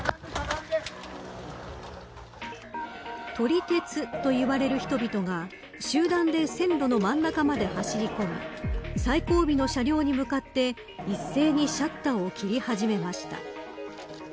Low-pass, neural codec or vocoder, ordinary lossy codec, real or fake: none; none; none; real